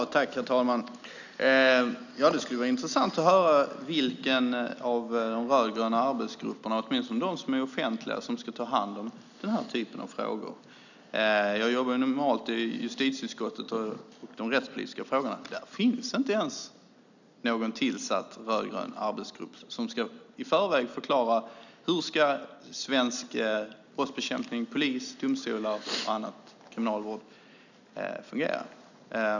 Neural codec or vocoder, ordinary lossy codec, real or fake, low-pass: none; none; real; 7.2 kHz